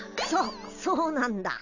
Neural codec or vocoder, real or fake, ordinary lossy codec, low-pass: none; real; none; 7.2 kHz